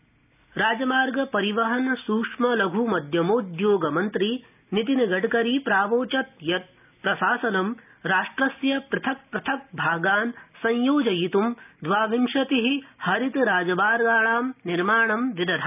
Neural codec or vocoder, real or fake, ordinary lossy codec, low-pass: none; real; none; 3.6 kHz